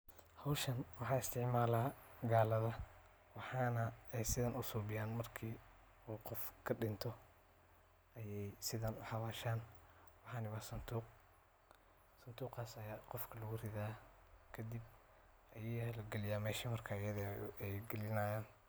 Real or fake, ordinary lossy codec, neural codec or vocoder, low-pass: real; none; none; none